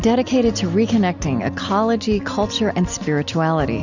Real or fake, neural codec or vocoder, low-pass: real; none; 7.2 kHz